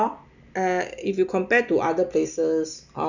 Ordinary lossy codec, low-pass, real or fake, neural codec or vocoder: none; 7.2 kHz; real; none